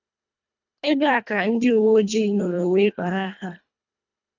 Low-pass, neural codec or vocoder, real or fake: 7.2 kHz; codec, 24 kHz, 1.5 kbps, HILCodec; fake